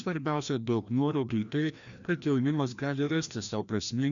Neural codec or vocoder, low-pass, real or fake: codec, 16 kHz, 1 kbps, FreqCodec, larger model; 7.2 kHz; fake